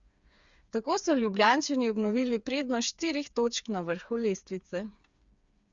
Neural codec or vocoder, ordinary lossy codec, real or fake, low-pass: codec, 16 kHz, 4 kbps, FreqCodec, smaller model; Opus, 64 kbps; fake; 7.2 kHz